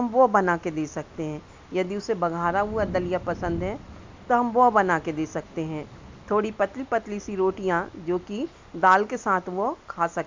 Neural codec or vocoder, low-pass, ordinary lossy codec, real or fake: none; 7.2 kHz; MP3, 64 kbps; real